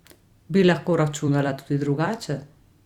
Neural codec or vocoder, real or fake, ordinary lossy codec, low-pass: vocoder, 44.1 kHz, 128 mel bands every 256 samples, BigVGAN v2; fake; Opus, 64 kbps; 19.8 kHz